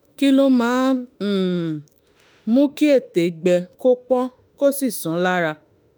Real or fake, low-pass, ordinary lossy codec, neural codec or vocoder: fake; none; none; autoencoder, 48 kHz, 32 numbers a frame, DAC-VAE, trained on Japanese speech